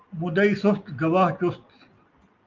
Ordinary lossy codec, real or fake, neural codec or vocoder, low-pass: Opus, 24 kbps; real; none; 7.2 kHz